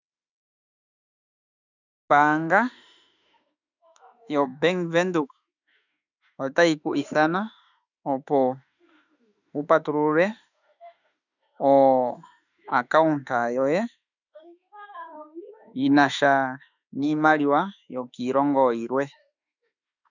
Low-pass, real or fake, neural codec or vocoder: 7.2 kHz; fake; autoencoder, 48 kHz, 32 numbers a frame, DAC-VAE, trained on Japanese speech